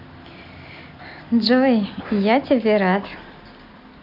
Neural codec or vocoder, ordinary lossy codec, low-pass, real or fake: none; none; 5.4 kHz; real